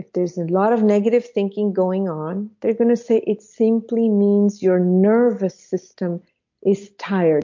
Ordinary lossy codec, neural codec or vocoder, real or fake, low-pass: MP3, 48 kbps; none; real; 7.2 kHz